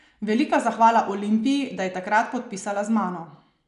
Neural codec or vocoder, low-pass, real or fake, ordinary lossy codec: none; 10.8 kHz; real; none